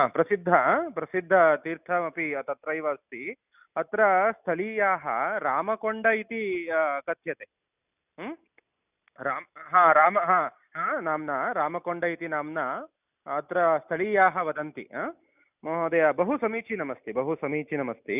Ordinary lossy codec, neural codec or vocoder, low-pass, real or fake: none; none; 3.6 kHz; real